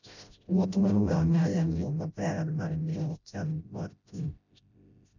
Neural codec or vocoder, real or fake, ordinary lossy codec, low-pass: codec, 16 kHz, 0.5 kbps, FreqCodec, smaller model; fake; none; 7.2 kHz